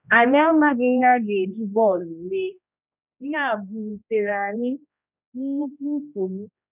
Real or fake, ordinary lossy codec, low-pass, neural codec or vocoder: fake; none; 3.6 kHz; codec, 16 kHz, 1 kbps, X-Codec, HuBERT features, trained on general audio